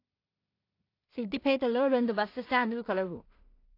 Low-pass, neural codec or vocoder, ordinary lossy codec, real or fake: 5.4 kHz; codec, 16 kHz in and 24 kHz out, 0.4 kbps, LongCat-Audio-Codec, two codebook decoder; AAC, 32 kbps; fake